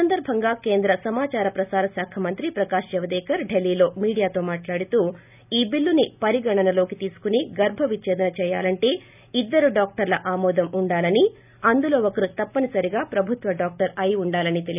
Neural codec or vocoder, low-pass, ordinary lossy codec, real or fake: none; 3.6 kHz; none; real